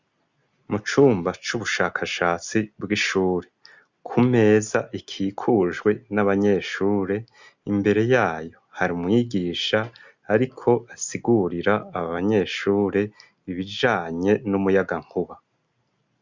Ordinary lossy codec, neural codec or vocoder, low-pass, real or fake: Opus, 64 kbps; none; 7.2 kHz; real